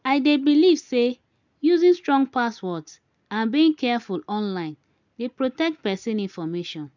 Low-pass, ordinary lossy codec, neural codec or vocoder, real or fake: 7.2 kHz; none; none; real